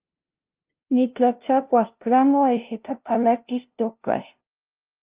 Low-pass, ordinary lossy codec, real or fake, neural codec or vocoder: 3.6 kHz; Opus, 32 kbps; fake; codec, 16 kHz, 0.5 kbps, FunCodec, trained on LibriTTS, 25 frames a second